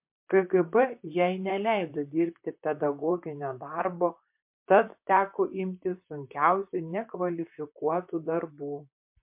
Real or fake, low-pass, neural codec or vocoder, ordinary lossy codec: fake; 3.6 kHz; vocoder, 44.1 kHz, 128 mel bands, Pupu-Vocoder; MP3, 32 kbps